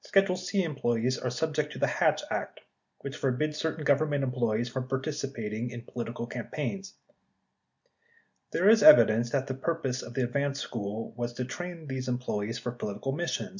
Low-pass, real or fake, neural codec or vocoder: 7.2 kHz; real; none